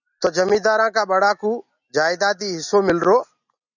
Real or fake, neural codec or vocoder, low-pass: real; none; 7.2 kHz